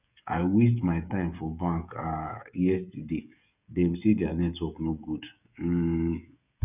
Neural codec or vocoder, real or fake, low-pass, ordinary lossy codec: codec, 16 kHz, 16 kbps, FreqCodec, smaller model; fake; 3.6 kHz; none